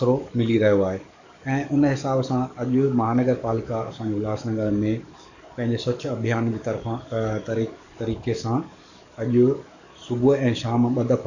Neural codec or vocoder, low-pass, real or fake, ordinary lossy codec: codec, 44.1 kHz, 7.8 kbps, DAC; 7.2 kHz; fake; none